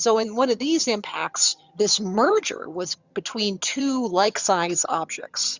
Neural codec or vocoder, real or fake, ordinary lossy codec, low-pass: vocoder, 22.05 kHz, 80 mel bands, HiFi-GAN; fake; Opus, 64 kbps; 7.2 kHz